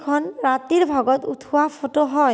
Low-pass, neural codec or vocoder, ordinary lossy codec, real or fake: none; none; none; real